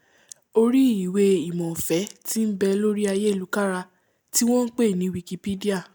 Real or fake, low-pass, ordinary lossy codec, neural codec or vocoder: real; none; none; none